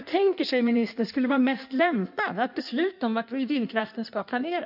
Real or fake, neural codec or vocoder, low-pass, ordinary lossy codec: fake; codec, 16 kHz in and 24 kHz out, 1.1 kbps, FireRedTTS-2 codec; 5.4 kHz; none